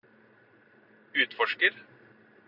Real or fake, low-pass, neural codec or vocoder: real; 5.4 kHz; none